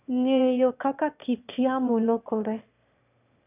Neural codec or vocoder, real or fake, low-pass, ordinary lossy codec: autoencoder, 22.05 kHz, a latent of 192 numbers a frame, VITS, trained on one speaker; fake; 3.6 kHz; none